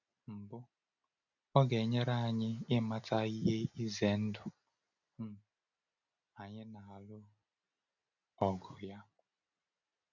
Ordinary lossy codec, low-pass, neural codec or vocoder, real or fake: none; 7.2 kHz; none; real